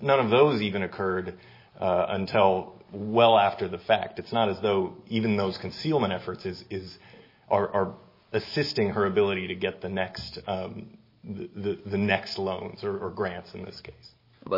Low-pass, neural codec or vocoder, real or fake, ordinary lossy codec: 5.4 kHz; none; real; MP3, 24 kbps